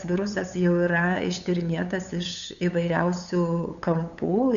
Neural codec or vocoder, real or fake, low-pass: codec, 16 kHz, 8 kbps, FunCodec, trained on LibriTTS, 25 frames a second; fake; 7.2 kHz